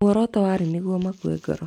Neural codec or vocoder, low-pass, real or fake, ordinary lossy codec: none; 19.8 kHz; real; none